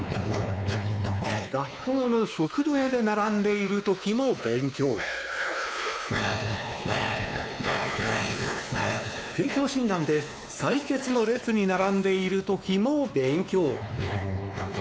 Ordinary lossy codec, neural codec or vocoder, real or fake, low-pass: none; codec, 16 kHz, 2 kbps, X-Codec, WavLM features, trained on Multilingual LibriSpeech; fake; none